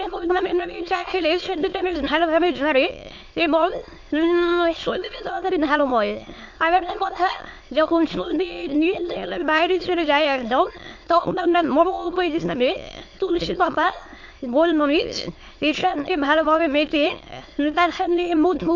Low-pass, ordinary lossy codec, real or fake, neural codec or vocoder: 7.2 kHz; MP3, 48 kbps; fake; autoencoder, 22.05 kHz, a latent of 192 numbers a frame, VITS, trained on many speakers